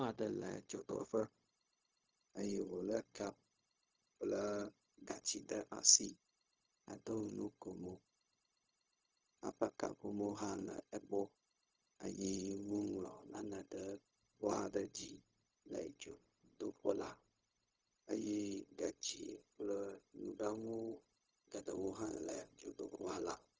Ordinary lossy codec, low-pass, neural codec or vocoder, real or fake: Opus, 24 kbps; 7.2 kHz; codec, 16 kHz, 0.4 kbps, LongCat-Audio-Codec; fake